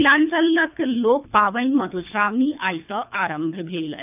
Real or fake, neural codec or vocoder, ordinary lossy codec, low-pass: fake; codec, 24 kHz, 3 kbps, HILCodec; none; 3.6 kHz